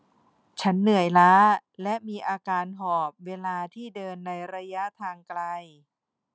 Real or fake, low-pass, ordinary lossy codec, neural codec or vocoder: real; none; none; none